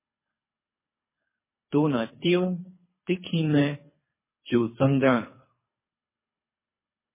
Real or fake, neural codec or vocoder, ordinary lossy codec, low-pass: fake; codec, 24 kHz, 3 kbps, HILCodec; MP3, 16 kbps; 3.6 kHz